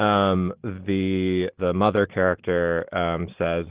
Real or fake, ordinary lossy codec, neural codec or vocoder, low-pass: real; Opus, 16 kbps; none; 3.6 kHz